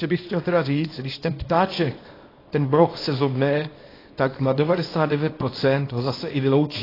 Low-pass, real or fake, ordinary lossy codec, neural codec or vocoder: 5.4 kHz; fake; AAC, 24 kbps; codec, 24 kHz, 0.9 kbps, WavTokenizer, small release